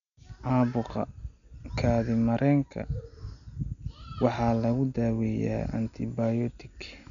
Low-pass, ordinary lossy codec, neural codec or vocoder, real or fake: 7.2 kHz; none; none; real